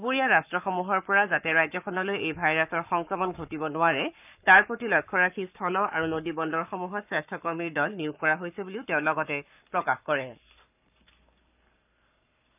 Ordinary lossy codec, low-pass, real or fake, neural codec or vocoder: none; 3.6 kHz; fake; codec, 44.1 kHz, 7.8 kbps, Pupu-Codec